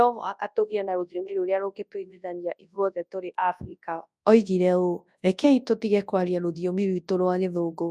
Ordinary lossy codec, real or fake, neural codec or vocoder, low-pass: none; fake; codec, 24 kHz, 0.9 kbps, WavTokenizer, large speech release; none